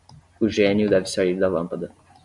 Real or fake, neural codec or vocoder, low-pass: real; none; 10.8 kHz